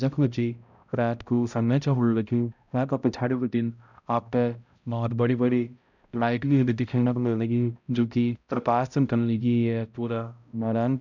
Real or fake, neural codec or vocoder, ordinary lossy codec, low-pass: fake; codec, 16 kHz, 0.5 kbps, X-Codec, HuBERT features, trained on balanced general audio; none; 7.2 kHz